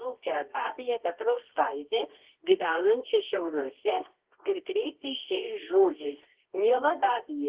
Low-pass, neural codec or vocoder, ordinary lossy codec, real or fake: 3.6 kHz; codec, 24 kHz, 0.9 kbps, WavTokenizer, medium music audio release; Opus, 16 kbps; fake